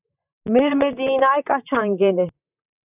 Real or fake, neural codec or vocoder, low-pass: fake; vocoder, 44.1 kHz, 128 mel bands, Pupu-Vocoder; 3.6 kHz